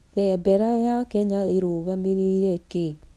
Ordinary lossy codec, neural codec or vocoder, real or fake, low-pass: none; codec, 24 kHz, 0.9 kbps, WavTokenizer, medium speech release version 1; fake; none